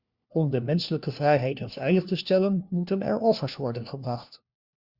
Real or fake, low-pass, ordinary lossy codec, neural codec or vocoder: fake; 5.4 kHz; Opus, 64 kbps; codec, 16 kHz, 1 kbps, FunCodec, trained on LibriTTS, 50 frames a second